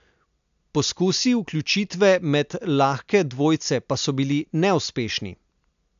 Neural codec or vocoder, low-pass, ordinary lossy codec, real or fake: none; 7.2 kHz; AAC, 96 kbps; real